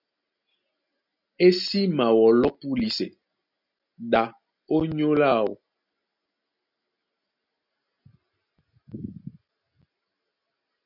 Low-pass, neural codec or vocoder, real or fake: 5.4 kHz; none; real